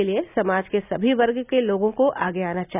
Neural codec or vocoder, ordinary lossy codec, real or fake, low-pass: none; none; real; 3.6 kHz